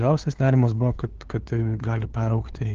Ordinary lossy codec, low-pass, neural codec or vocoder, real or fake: Opus, 16 kbps; 7.2 kHz; codec, 16 kHz, 2 kbps, FunCodec, trained on LibriTTS, 25 frames a second; fake